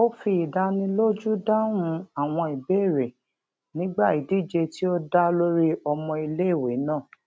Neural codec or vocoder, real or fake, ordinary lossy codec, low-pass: none; real; none; none